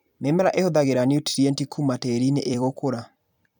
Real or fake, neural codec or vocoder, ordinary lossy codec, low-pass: fake; vocoder, 44.1 kHz, 128 mel bands every 256 samples, BigVGAN v2; none; 19.8 kHz